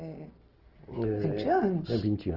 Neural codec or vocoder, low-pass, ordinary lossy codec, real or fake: none; 5.4 kHz; Opus, 16 kbps; real